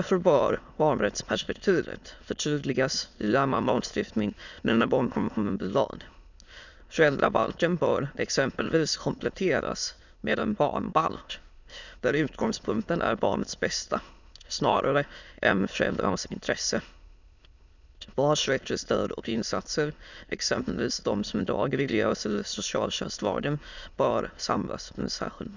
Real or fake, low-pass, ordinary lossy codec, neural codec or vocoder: fake; 7.2 kHz; none; autoencoder, 22.05 kHz, a latent of 192 numbers a frame, VITS, trained on many speakers